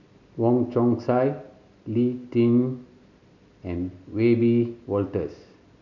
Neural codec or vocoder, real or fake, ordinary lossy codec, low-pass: none; real; none; 7.2 kHz